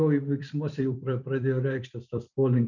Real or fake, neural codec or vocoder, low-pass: fake; vocoder, 44.1 kHz, 128 mel bands every 256 samples, BigVGAN v2; 7.2 kHz